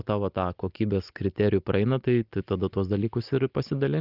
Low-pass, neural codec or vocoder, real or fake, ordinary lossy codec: 5.4 kHz; none; real; Opus, 32 kbps